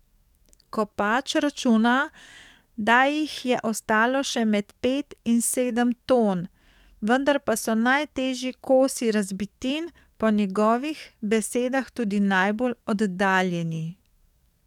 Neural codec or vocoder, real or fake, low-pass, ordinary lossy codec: codec, 44.1 kHz, 7.8 kbps, DAC; fake; 19.8 kHz; none